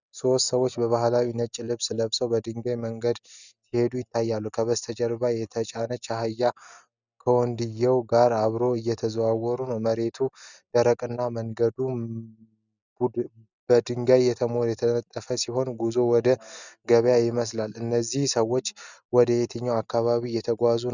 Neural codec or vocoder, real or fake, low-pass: none; real; 7.2 kHz